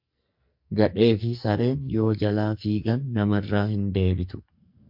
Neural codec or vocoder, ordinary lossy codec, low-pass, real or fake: codec, 44.1 kHz, 2.6 kbps, SNAC; MP3, 48 kbps; 5.4 kHz; fake